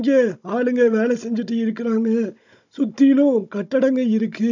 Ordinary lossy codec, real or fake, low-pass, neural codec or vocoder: none; fake; 7.2 kHz; codec, 16 kHz, 16 kbps, FunCodec, trained on Chinese and English, 50 frames a second